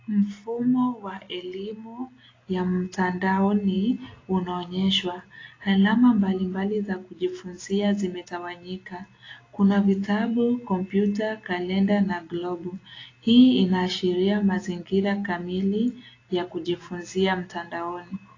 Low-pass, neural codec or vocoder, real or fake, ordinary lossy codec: 7.2 kHz; none; real; AAC, 32 kbps